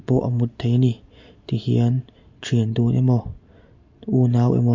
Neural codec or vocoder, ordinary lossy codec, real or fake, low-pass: none; MP3, 48 kbps; real; 7.2 kHz